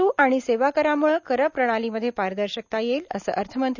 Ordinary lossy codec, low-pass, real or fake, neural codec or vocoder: none; 7.2 kHz; real; none